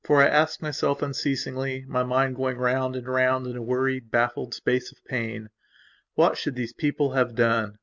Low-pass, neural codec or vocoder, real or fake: 7.2 kHz; none; real